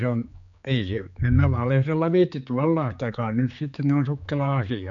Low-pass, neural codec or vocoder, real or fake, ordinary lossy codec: 7.2 kHz; codec, 16 kHz, 4 kbps, X-Codec, HuBERT features, trained on general audio; fake; none